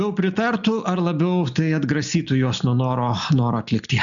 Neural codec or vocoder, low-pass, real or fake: none; 7.2 kHz; real